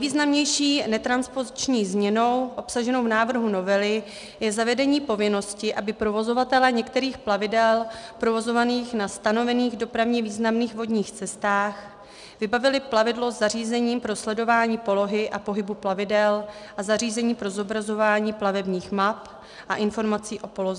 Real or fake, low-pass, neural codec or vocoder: real; 10.8 kHz; none